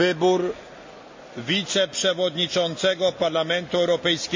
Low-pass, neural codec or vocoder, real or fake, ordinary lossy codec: 7.2 kHz; none; real; MP3, 64 kbps